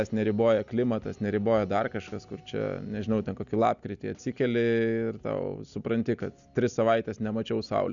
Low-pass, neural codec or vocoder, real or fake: 7.2 kHz; none; real